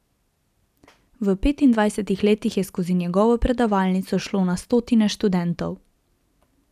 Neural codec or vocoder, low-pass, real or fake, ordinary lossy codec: none; 14.4 kHz; real; none